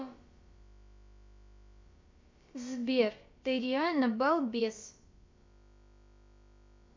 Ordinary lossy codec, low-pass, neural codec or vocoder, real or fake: MP3, 48 kbps; 7.2 kHz; codec, 16 kHz, about 1 kbps, DyCAST, with the encoder's durations; fake